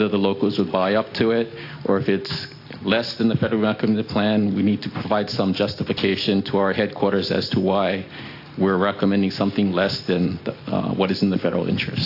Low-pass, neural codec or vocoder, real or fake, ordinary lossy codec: 5.4 kHz; none; real; AAC, 32 kbps